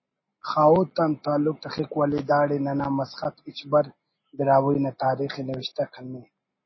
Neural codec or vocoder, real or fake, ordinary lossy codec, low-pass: none; real; MP3, 24 kbps; 7.2 kHz